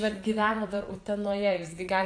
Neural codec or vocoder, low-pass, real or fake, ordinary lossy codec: vocoder, 22.05 kHz, 80 mel bands, Vocos; 9.9 kHz; fake; AAC, 48 kbps